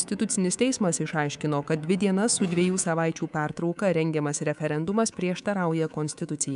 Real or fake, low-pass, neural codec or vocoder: fake; 10.8 kHz; codec, 24 kHz, 3.1 kbps, DualCodec